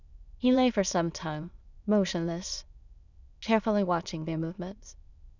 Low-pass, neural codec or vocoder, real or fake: 7.2 kHz; autoencoder, 22.05 kHz, a latent of 192 numbers a frame, VITS, trained on many speakers; fake